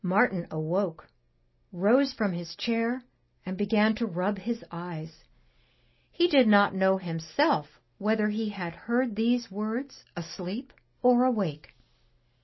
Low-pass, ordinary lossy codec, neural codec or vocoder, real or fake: 7.2 kHz; MP3, 24 kbps; none; real